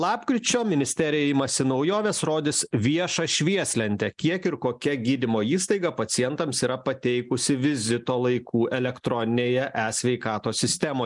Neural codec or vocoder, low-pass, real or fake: none; 10.8 kHz; real